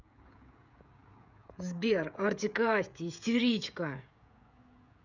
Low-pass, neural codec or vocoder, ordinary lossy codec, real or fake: 7.2 kHz; codec, 16 kHz, 16 kbps, FreqCodec, smaller model; none; fake